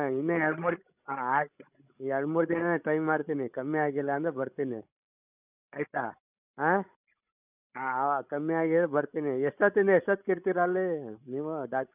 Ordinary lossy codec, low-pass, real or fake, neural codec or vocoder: none; 3.6 kHz; fake; codec, 16 kHz, 16 kbps, FreqCodec, larger model